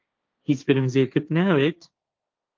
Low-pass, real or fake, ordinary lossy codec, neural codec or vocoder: 7.2 kHz; fake; Opus, 24 kbps; codec, 16 kHz, 1.1 kbps, Voila-Tokenizer